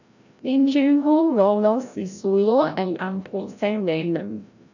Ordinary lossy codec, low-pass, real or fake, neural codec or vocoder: none; 7.2 kHz; fake; codec, 16 kHz, 0.5 kbps, FreqCodec, larger model